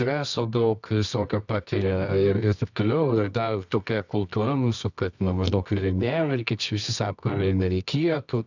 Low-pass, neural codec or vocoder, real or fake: 7.2 kHz; codec, 24 kHz, 0.9 kbps, WavTokenizer, medium music audio release; fake